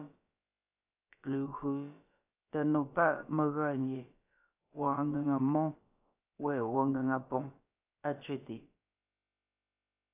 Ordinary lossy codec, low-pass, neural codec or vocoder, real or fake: AAC, 24 kbps; 3.6 kHz; codec, 16 kHz, about 1 kbps, DyCAST, with the encoder's durations; fake